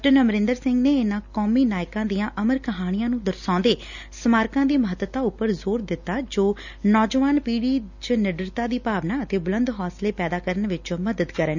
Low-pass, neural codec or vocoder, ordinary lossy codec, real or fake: 7.2 kHz; none; none; real